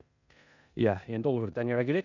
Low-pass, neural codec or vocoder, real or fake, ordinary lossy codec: 7.2 kHz; codec, 16 kHz in and 24 kHz out, 0.9 kbps, LongCat-Audio-Codec, four codebook decoder; fake; none